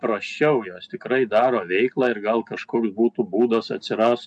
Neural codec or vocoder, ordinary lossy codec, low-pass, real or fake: none; MP3, 96 kbps; 10.8 kHz; real